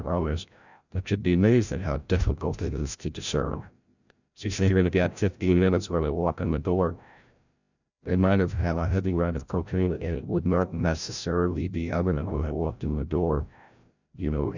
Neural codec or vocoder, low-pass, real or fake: codec, 16 kHz, 0.5 kbps, FreqCodec, larger model; 7.2 kHz; fake